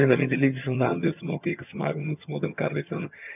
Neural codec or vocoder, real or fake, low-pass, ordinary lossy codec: vocoder, 22.05 kHz, 80 mel bands, HiFi-GAN; fake; 3.6 kHz; none